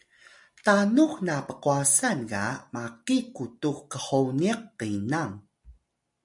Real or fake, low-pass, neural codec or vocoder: real; 10.8 kHz; none